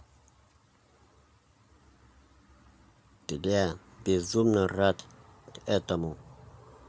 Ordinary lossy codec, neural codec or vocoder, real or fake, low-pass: none; none; real; none